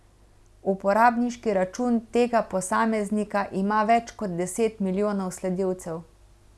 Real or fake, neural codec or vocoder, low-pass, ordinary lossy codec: real; none; none; none